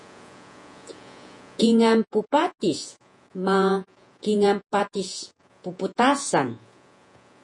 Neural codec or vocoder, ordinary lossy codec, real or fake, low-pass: vocoder, 48 kHz, 128 mel bands, Vocos; MP3, 48 kbps; fake; 10.8 kHz